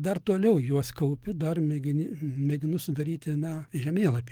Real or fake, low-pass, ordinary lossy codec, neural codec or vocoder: fake; 19.8 kHz; Opus, 24 kbps; codec, 44.1 kHz, 7.8 kbps, DAC